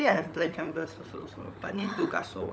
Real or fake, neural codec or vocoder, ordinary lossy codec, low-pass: fake; codec, 16 kHz, 16 kbps, FunCodec, trained on LibriTTS, 50 frames a second; none; none